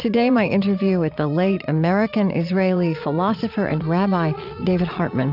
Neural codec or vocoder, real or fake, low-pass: vocoder, 22.05 kHz, 80 mel bands, Vocos; fake; 5.4 kHz